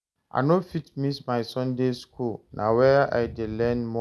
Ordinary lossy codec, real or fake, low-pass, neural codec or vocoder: none; real; none; none